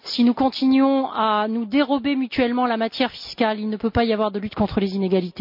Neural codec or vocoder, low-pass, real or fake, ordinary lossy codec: none; 5.4 kHz; real; MP3, 48 kbps